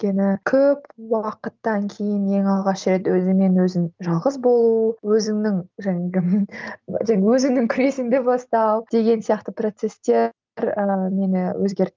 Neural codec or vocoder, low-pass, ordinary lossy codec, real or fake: none; 7.2 kHz; Opus, 32 kbps; real